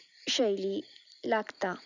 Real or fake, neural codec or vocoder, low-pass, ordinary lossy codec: real; none; 7.2 kHz; none